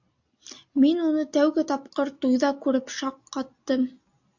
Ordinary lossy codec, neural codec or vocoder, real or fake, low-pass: AAC, 48 kbps; none; real; 7.2 kHz